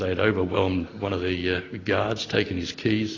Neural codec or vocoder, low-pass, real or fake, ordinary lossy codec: none; 7.2 kHz; real; AAC, 32 kbps